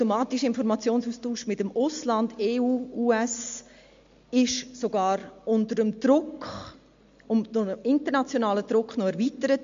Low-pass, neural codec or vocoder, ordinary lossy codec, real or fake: 7.2 kHz; none; MP3, 48 kbps; real